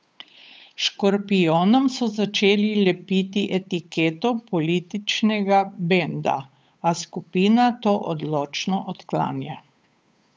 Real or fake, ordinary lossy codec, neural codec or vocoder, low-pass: fake; none; codec, 16 kHz, 8 kbps, FunCodec, trained on Chinese and English, 25 frames a second; none